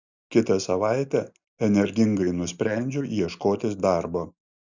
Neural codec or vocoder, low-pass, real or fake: none; 7.2 kHz; real